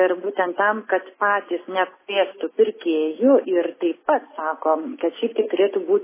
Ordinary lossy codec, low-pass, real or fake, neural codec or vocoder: MP3, 16 kbps; 3.6 kHz; real; none